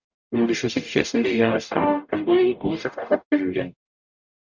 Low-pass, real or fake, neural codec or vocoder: 7.2 kHz; fake; codec, 44.1 kHz, 0.9 kbps, DAC